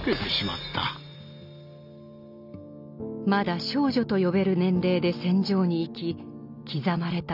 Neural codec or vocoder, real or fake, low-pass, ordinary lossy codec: none; real; 5.4 kHz; none